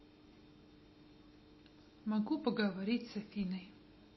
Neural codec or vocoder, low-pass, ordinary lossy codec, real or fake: none; 7.2 kHz; MP3, 24 kbps; real